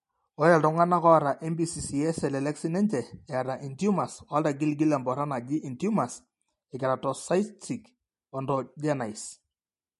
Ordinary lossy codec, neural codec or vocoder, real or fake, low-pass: MP3, 48 kbps; none; real; 14.4 kHz